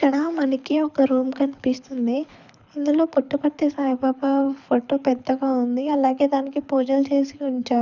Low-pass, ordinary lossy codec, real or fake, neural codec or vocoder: 7.2 kHz; none; fake; codec, 24 kHz, 6 kbps, HILCodec